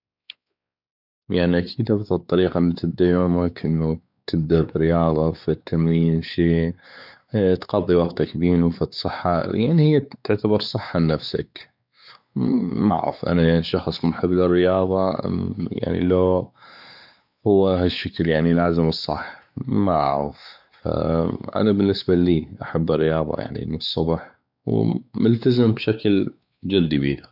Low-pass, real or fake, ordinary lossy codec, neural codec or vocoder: 5.4 kHz; fake; none; codec, 16 kHz, 4 kbps, X-Codec, WavLM features, trained on Multilingual LibriSpeech